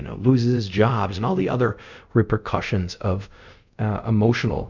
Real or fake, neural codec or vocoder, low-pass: fake; codec, 24 kHz, 0.5 kbps, DualCodec; 7.2 kHz